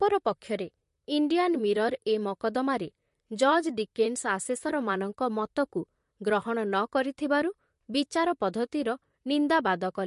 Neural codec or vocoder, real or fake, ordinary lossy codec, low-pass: vocoder, 44.1 kHz, 128 mel bands, Pupu-Vocoder; fake; MP3, 48 kbps; 14.4 kHz